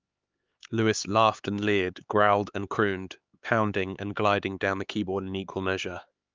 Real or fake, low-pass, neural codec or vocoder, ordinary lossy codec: fake; 7.2 kHz; codec, 16 kHz, 4 kbps, X-Codec, HuBERT features, trained on LibriSpeech; Opus, 24 kbps